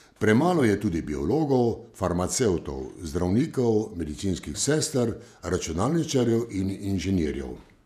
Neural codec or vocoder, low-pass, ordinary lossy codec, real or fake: vocoder, 48 kHz, 128 mel bands, Vocos; 14.4 kHz; none; fake